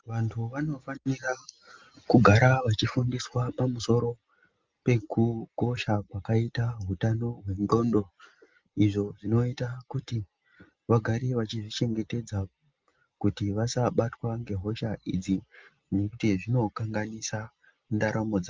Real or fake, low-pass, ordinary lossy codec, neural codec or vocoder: real; 7.2 kHz; Opus, 32 kbps; none